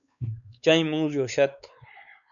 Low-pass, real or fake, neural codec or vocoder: 7.2 kHz; fake; codec, 16 kHz, 4 kbps, X-Codec, WavLM features, trained on Multilingual LibriSpeech